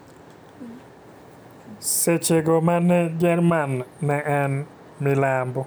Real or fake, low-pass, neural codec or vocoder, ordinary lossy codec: real; none; none; none